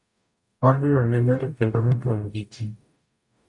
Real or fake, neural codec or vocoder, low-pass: fake; codec, 44.1 kHz, 0.9 kbps, DAC; 10.8 kHz